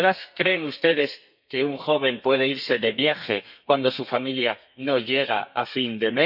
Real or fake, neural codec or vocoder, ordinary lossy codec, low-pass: fake; codec, 32 kHz, 1.9 kbps, SNAC; none; 5.4 kHz